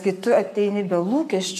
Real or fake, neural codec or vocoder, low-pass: fake; codec, 44.1 kHz, 7.8 kbps, Pupu-Codec; 14.4 kHz